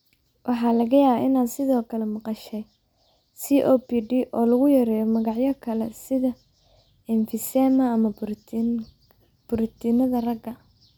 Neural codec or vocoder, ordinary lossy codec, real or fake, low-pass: none; none; real; none